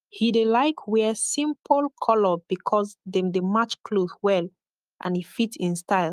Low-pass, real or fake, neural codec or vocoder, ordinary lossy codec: 14.4 kHz; fake; autoencoder, 48 kHz, 128 numbers a frame, DAC-VAE, trained on Japanese speech; Opus, 32 kbps